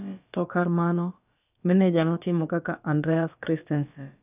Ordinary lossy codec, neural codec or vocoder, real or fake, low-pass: none; codec, 16 kHz, about 1 kbps, DyCAST, with the encoder's durations; fake; 3.6 kHz